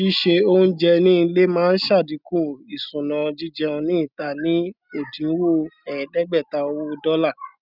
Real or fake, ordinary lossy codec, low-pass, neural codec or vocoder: real; none; 5.4 kHz; none